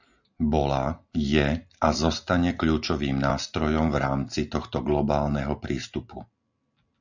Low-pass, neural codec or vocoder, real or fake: 7.2 kHz; none; real